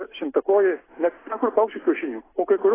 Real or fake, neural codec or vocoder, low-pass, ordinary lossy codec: real; none; 3.6 kHz; AAC, 16 kbps